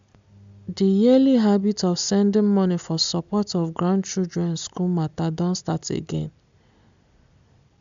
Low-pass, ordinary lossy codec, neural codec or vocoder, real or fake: 7.2 kHz; MP3, 64 kbps; none; real